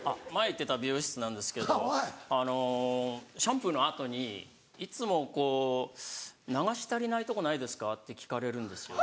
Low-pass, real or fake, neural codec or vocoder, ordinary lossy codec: none; real; none; none